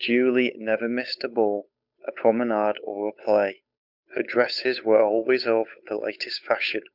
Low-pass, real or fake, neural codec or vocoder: 5.4 kHz; fake; codec, 16 kHz, 8 kbps, FunCodec, trained on Chinese and English, 25 frames a second